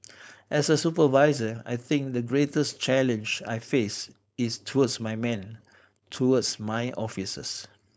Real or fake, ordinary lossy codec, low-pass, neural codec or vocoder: fake; none; none; codec, 16 kHz, 4.8 kbps, FACodec